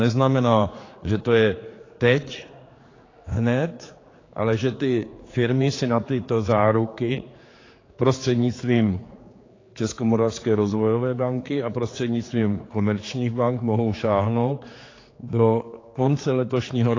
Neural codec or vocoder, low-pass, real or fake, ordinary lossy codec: codec, 16 kHz, 4 kbps, X-Codec, HuBERT features, trained on general audio; 7.2 kHz; fake; AAC, 32 kbps